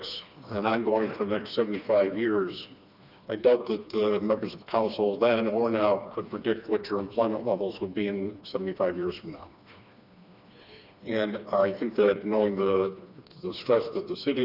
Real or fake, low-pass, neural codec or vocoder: fake; 5.4 kHz; codec, 16 kHz, 2 kbps, FreqCodec, smaller model